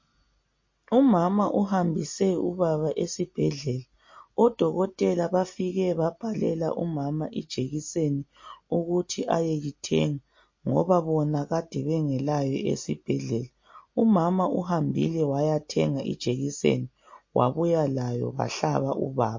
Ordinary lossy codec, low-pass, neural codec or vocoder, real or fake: MP3, 32 kbps; 7.2 kHz; none; real